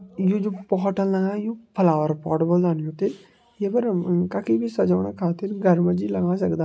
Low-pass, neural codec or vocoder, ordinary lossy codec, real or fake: none; none; none; real